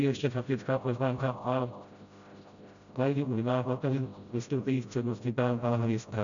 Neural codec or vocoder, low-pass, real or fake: codec, 16 kHz, 0.5 kbps, FreqCodec, smaller model; 7.2 kHz; fake